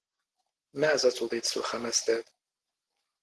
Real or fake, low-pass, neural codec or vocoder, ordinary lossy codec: real; 10.8 kHz; none; Opus, 16 kbps